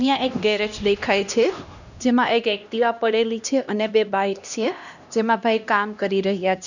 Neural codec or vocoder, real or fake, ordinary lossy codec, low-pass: codec, 16 kHz, 1 kbps, X-Codec, HuBERT features, trained on LibriSpeech; fake; none; 7.2 kHz